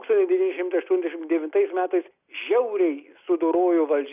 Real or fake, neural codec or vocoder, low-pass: real; none; 3.6 kHz